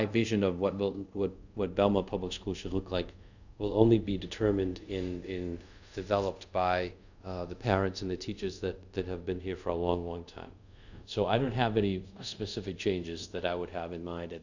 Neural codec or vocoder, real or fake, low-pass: codec, 24 kHz, 0.5 kbps, DualCodec; fake; 7.2 kHz